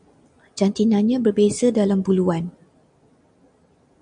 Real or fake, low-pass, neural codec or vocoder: real; 9.9 kHz; none